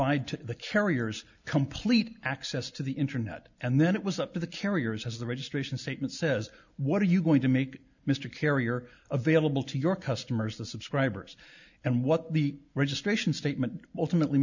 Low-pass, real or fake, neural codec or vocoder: 7.2 kHz; real; none